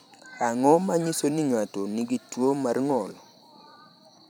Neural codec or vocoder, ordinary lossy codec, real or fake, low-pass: vocoder, 44.1 kHz, 128 mel bands every 512 samples, BigVGAN v2; none; fake; none